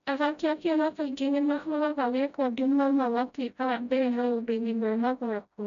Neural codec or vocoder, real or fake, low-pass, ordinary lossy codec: codec, 16 kHz, 0.5 kbps, FreqCodec, smaller model; fake; 7.2 kHz; none